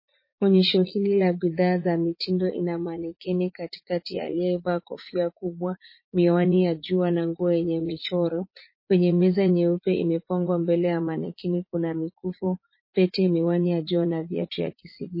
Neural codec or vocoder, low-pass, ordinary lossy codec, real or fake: vocoder, 44.1 kHz, 80 mel bands, Vocos; 5.4 kHz; MP3, 24 kbps; fake